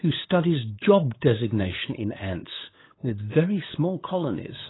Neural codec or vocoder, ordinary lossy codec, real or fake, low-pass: codec, 16 kHz, 8 kbps, FunCodec, trained on LibriTTS, 25 frames a second; AAC, 16 kbps; fake; 7.2 kHz